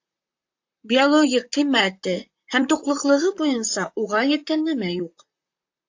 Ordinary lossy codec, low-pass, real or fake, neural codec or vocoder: AAC, 48 kbps; 7.2 kHz; fake; vocoder, 44.1 kHz, 128 mel bands, Pupu-Vocoder